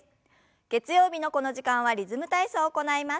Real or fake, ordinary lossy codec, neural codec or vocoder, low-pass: real; none; none; none